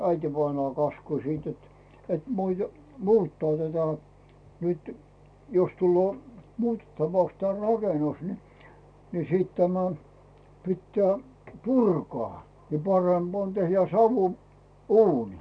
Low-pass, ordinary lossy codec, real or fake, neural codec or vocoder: 9.9 kHz; none; real; none